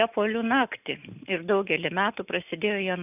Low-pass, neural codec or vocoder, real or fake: 3.6 kHz; none; real